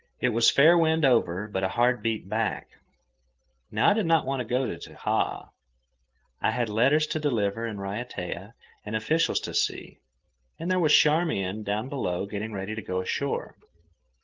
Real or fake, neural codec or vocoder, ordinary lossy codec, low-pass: real; none; Opus, 16 kbps; 7.2 kHz